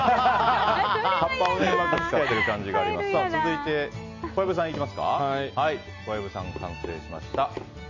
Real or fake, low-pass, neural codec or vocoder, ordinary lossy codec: real; 7.2 kHz; none; none